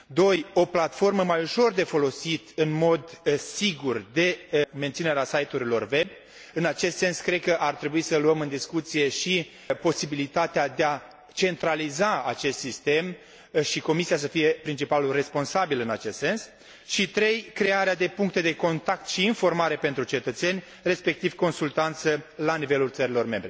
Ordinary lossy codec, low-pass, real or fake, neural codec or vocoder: none; none; real; none